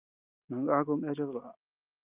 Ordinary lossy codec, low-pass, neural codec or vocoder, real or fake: Opus, 32 kbps; 3.6 kHz; none; real